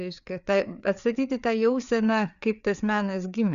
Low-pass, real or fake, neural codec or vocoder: 7.2 kHz; fake; codec, 16 kHz, 4 kbps, FreqCodec, larger model